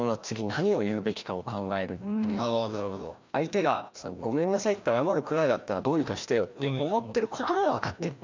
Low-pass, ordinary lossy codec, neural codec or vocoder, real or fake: 7.2 kHz; MP3, 64 kbps; codec, 16 kHz, 1 kbps, FreqCodec, larger model; fake